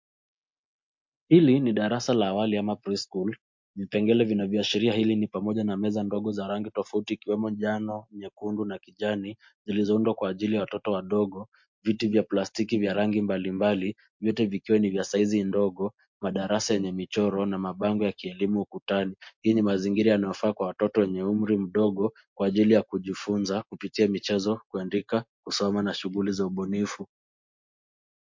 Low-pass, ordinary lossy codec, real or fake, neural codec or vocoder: 7.2 kHz; MP3, 48 kbps; real; none